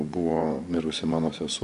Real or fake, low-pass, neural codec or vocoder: fake; 10.8 kHz; vocoder, 24 kHz, 100 mel bands, Vocos